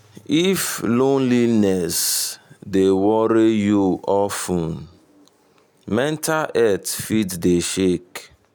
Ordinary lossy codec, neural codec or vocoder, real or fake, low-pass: none; none; real; none